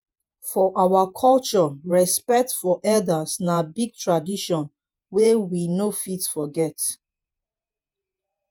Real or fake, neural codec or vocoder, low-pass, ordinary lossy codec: fake; vocoder, 48 kHz, 128 mel bands, Vocos; none; none